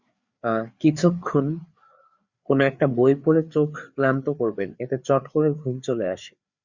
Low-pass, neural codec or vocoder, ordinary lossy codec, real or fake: 7.2 kHz; codec, 16 kHz, 4 kbps, FreqCodec, larger model; Opus, 64 kbps; fake